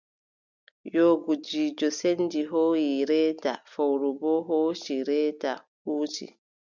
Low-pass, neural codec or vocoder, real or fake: 7.2 kHz; none; real